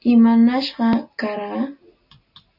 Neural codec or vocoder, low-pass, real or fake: none; 5.4 kHz; real